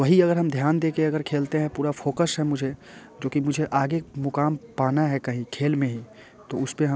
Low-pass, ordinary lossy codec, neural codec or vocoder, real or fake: none; none; none; real